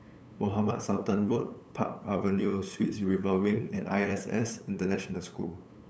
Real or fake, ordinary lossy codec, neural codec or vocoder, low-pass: fake; none; codec, 16 kHz, 8 kbps, FunCodec, trained on LibriTTS, 25 frames a second; none